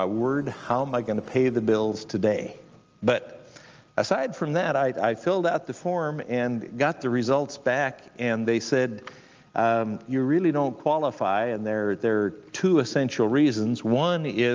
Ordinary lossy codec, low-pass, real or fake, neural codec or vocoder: Opus, 24 kbps; 7.2 kHz; real; none